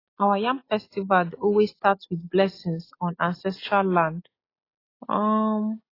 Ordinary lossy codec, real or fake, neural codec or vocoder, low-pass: AAC, 24 kbps; real; none; 5.4 kHz